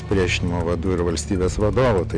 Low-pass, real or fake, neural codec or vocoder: 9.9 kHz; real; none